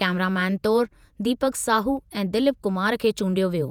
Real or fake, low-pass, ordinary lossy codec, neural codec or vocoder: fake; 19.8 kHz; none; vocoder, 48 kHz, 128 mel bands, Vocos